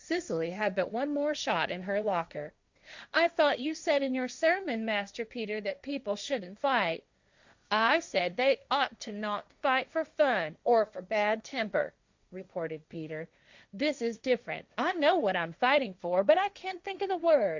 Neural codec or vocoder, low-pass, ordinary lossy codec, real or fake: codec, 16 kHz, 1.1 kbps, Voila-Tokenizer; 7.2 kHz; Opus, 64 kbps; fake